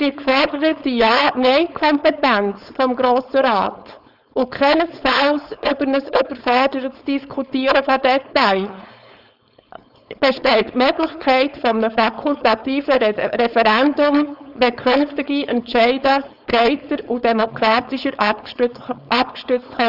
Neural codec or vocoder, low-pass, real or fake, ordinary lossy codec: codec, 16 kHz, 4.8 kbps, FACodec; 5.4 kHz; fake; none